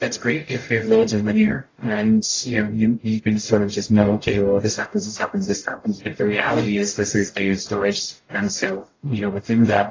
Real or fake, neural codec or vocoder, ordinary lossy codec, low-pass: fake; codec, 44.1 kHz, 0.9 kbps, DAC; AAC, 32 kbps; 7.2 kHz